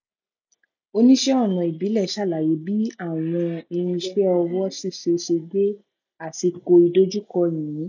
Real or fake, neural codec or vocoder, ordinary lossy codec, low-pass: real; none; none; 7.2 kHz